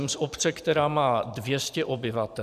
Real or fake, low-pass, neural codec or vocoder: fake; 14.4 kHz; vocoder, 44.1 kHz, 128 mel bands every 512 samples, BigVGAN v2